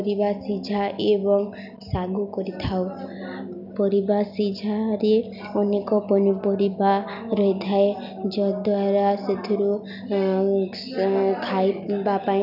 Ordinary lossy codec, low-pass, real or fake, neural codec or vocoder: none; 5.4 kHz; real; none